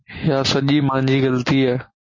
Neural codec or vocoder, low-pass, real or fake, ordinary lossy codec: none; 7.2 kHz; real; MP3, 32 kbps